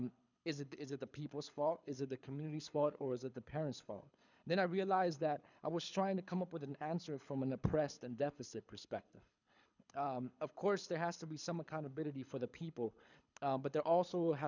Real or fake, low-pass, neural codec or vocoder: fake; 7.2 kHz; codec, 24 kHz, 6 kbps, HILCodec